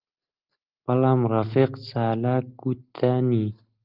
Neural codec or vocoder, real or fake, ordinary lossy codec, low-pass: none; real; Opus, 24 kbps; 5.4 kHz